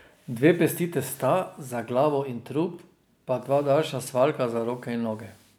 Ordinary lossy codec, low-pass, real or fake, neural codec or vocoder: none; none; real; none